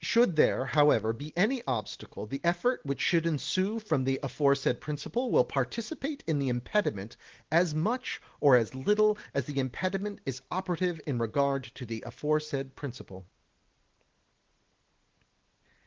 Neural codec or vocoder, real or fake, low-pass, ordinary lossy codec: none; real; 7.2 kHz; Opus, 16 kbps